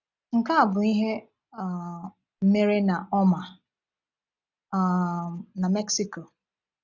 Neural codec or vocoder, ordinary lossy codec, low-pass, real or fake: none; none; 7.2 kHz; real